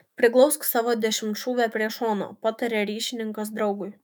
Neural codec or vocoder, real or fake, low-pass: autoencoder, 48 kHz, 128 numbers a frame, DAC-VAE, trained on Japanese speech; fake; 19.8 kHz